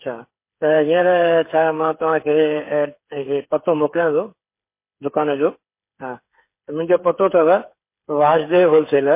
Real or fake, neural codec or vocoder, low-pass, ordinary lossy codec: fake; codec, 16 kHz, 8 kbps, FreqCodec, smaller model; 3.6 kHz; MP3, 24 kbps